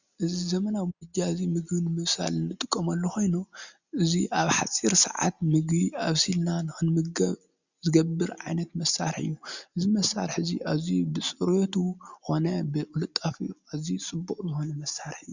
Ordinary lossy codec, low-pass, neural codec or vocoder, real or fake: Opus, 64 kbps; 7.2 kHz; none; real